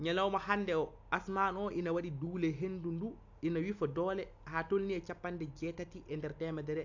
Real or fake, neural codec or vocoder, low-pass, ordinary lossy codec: real; none; 7.2 kHz; AAC, 48 kbps